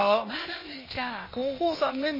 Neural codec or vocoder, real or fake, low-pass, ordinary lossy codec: codec, 16 kHz, 0.8 kbps, ZipCodec; fake; 5.4 kHz; MP3, 24 kbps